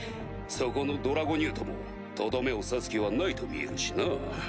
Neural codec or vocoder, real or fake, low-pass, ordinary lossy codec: none; real; none; none